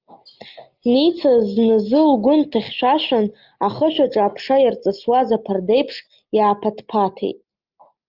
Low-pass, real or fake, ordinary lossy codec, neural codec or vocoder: 5.4 kHz; real; Opus, 32 kbps; none